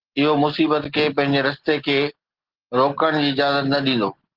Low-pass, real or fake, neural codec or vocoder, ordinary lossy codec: 5.4 kHz; real; none; Opus, 16 kbps